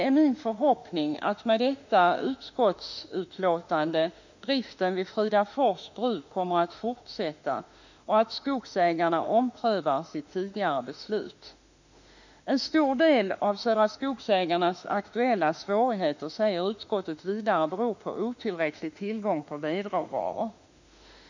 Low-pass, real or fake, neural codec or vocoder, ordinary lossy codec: 7.2 kHz; fake; autoencoder, 48 kHz, 32 numbers a frame, DAC-VAE, trained on Japanese speech; none